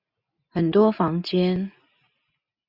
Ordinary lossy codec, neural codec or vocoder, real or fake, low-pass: Opus, 64 kbps; none; real; 5.4 kHz